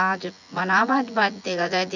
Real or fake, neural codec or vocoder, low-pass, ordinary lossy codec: fake; vocoder, 24 kHz, 100 mel bands, Vocos; 7.2 kHz; none